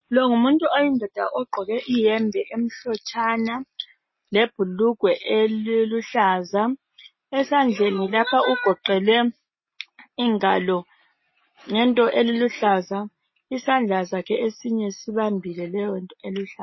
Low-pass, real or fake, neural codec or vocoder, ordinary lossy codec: 7.2 kHz; real; none; MP3, 24 kbps